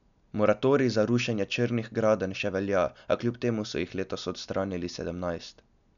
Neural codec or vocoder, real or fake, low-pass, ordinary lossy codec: none; real; 7.2 kHz; none